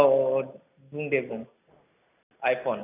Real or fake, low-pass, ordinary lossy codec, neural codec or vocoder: real; 3.6 kHz; none; none